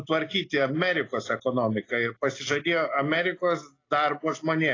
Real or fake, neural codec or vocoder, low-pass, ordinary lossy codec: real; none; 7.2 kHz; AAC, 32 kbps